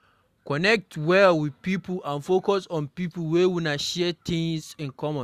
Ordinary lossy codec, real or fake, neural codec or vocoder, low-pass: Opus, 64 kbps; real; none; 14.4 kHz